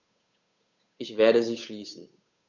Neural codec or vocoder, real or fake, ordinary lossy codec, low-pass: codec, 16 kHz, 8 kbps, FunCodec, trained on Chinese and English, 25 frames a second; fake; none; 7.2 kHz